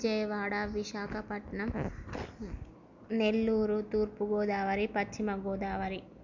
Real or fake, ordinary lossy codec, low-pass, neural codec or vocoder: real; none; 7.2 kHz; none